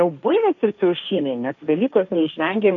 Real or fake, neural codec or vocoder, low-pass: fake; codec, 16 kHz, 1.1 kbps, Voila-Tokenizer; 7.2 kHz